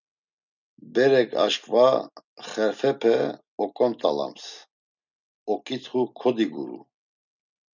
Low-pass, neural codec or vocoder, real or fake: 7.2 kHz; none; real